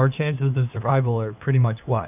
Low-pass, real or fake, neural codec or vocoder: 3.6 kHz; fake; codec, 16 kHz, 2 kbps, FunCodec, trained on LibriTTS, 25 frames a second